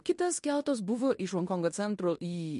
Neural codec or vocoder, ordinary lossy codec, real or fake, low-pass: codec, 16 kHz in and 24 kHz out, 0.9 kbps, LongCat-Audio-Codec, fine tuned four codebook decoder; MP3, 48 kbps; fake; 10.8 kHz